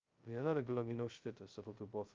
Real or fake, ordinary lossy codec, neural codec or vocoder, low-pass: fake; Opus, 24 kbps; codec, 16 kHz, 0.2 kbps, FocalCodec; 7.2 kHz